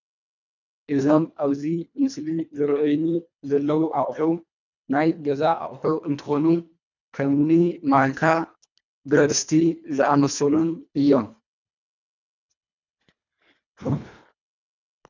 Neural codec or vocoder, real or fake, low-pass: codec, 24 kHz, 1.5 kbps, HILCodec; fake; 7.2 kHz